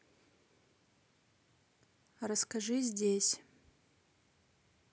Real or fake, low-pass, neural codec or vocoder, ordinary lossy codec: real; none; none; none